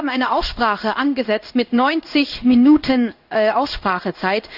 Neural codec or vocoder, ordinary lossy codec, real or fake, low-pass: codec, 16 kHz in and 24 kHz out, 1 kbps, XY-Tokenizer; none; fake; 5.4 kHz